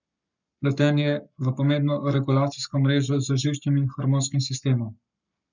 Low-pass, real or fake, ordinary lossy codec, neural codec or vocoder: 7.2 kHz; fake; none; codec, 44.1 kHz, 7.8 kbps, Pupu-Codec